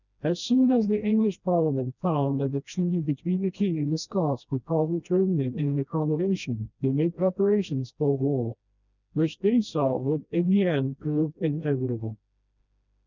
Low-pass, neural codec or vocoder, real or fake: 7.2 kHz; codec, 16 kHz, 1 kbps, FreqCodec, smaller model; fake